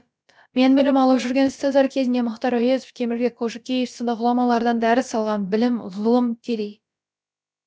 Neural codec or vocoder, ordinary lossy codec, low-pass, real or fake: codec, 16 kHz, about 1 kbps, DyCAST, with the encoder's durations; none; none; fake